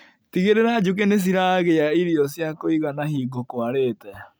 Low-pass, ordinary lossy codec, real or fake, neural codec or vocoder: none; none; real; none